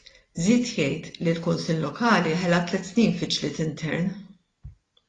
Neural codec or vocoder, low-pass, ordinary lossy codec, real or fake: none; 9.9 kHz; AAC, 32 kbps; real